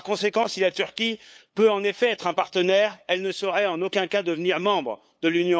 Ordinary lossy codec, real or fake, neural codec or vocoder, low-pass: none; fake; codec, 16 kHz, 8 kbps, FunCodec, trained on LibriTTS, 25 frames a second; none